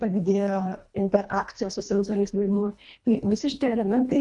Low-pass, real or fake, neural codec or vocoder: 10.8 kHz; fake; codec, 24 kHz, 1.5 kbps, HILCodec